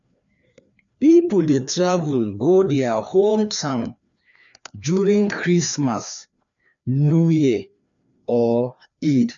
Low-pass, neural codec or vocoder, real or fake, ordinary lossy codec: 7.2 kHz; codec, 16 kHz, 2 kbps, FreqCodec, larger model; fake; none